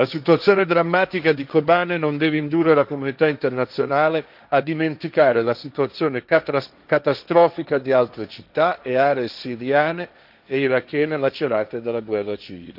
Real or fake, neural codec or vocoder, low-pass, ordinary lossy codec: fake; codec, 16 kHz, 1.1 kbps, Voila-Tokenizer; 5.4 kHz; none